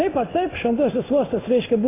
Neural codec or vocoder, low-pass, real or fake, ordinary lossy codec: codec, 16 kHz in and 24 kHz out, 1 kbps, XY-Tokenizer; 3.6 kHz; fake; AAC, 16 kbps